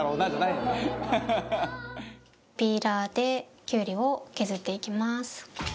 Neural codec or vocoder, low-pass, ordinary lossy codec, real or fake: none; none; none; real